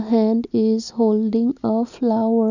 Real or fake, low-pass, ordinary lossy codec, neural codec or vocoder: real; 7.2 kHz; none; none